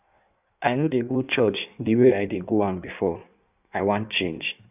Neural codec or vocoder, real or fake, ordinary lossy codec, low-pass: codec, 16 kHz in and 24 kHz out, 1.1 kbps, FireRedTTS-2 codec; fake; none; 3.6 kHz